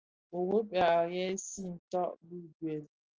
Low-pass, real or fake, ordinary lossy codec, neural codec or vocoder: 7.2 kHz; real; Opus, 24 kbps; none